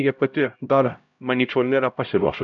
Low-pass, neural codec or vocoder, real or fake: 7.2 kHz; codec, 16 kHz, 0.5 kbps, X-Codec, HuBERT features, trained on LibriSpeech; fake